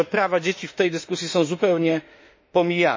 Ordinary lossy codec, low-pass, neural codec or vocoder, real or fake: MP3, 32 kbps; 7.2 kHz; autoencoder, 48 kHz, 32 numbers a frame, DAC-VAE, trained on Japanese speech; fake